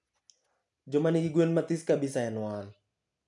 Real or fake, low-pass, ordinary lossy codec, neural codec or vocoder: real; 10.8 kHz; none; none